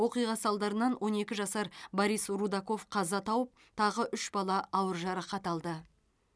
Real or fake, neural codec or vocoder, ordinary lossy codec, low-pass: real; none; none; none